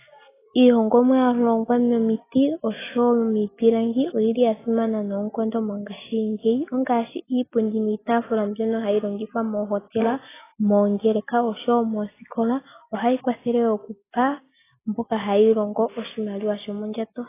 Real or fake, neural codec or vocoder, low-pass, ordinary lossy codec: real; none; 3.6 kHz; AAC, 16 kbps